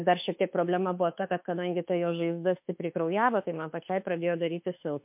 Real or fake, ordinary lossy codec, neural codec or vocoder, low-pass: fake; MP3, 32 kbps; autoencoder, 48 kHz, 32 numbers a frame, DAC-VAE, trained on Japanese speech; 3.6 kHz